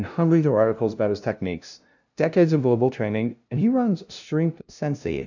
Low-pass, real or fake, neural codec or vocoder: 7.2 kHz; fake; codec, 16 kHz, 0.5 kbps, FunCodec, trained on LibriTTS, 25 frames a second